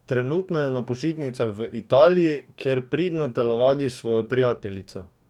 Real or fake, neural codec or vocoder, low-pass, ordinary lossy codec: fake; codec, 44.1 kHz, 2.6 kbps, DAC; 19.8 kHz; none